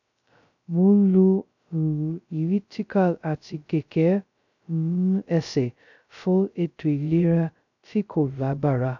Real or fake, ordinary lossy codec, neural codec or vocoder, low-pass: fake; none; codec, 16 kHz, 0.2 kbps, FocalCodec; 7.2 kHz